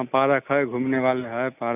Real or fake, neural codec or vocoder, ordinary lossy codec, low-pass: real; none; none; 3.6 kHz